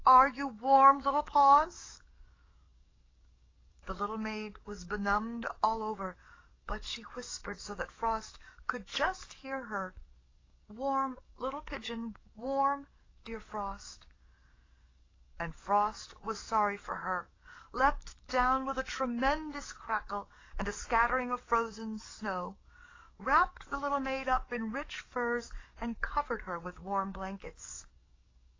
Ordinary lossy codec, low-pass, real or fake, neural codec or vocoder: AAC, 32 kbps; 7.2 kHz; fake; codec, 44.1 kHz, 7.8 kbps, DAC